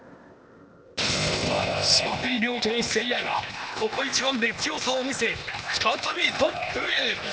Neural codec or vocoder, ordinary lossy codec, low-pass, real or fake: codec, 16 kHz, 0.8 kbps, ZipCodec; none; none; fake